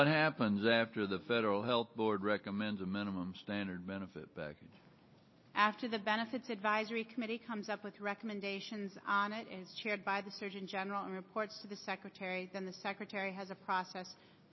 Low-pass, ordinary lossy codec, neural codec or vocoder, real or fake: 7.2 kHz; MP3, 24 kbps; none; real